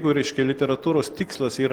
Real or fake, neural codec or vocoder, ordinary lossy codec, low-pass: real; none; Opus, 16 kbps; 19.8 kHz